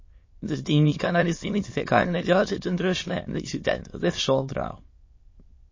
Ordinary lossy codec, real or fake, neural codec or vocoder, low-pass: MP3, 32 kbps; fake; autoencoder, 22.05 kHz, a latent of 192 numbers a frame, VITS, trained on many speakers; 7.2 kHz